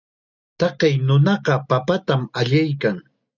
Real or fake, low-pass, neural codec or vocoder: real; 7.2 kHz; none